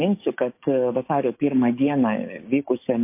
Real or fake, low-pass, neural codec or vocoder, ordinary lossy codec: fake; 3.6 kHz; codec, 16 kHz, 6 kbps, DAC; MP3, 24 kbps